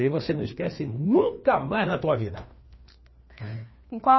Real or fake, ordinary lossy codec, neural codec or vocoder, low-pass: fake; MP3, 24 kbps; codec, 16 kHz, 2 kbps, FreqCodec, larger model; 7.2 kHz